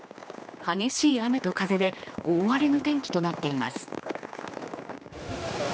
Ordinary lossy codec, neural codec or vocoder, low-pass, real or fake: none; codec, 16 kHz, 2 kbps, X-Codec, HuBERT features, trained on general audio; none; fake